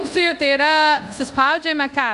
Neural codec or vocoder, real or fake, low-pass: codec, 24 kHz, 0.5 kbps, DualCodec; fake; 10.8 kHz